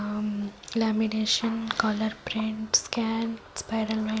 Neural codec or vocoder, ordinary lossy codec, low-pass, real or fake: none; none; none; real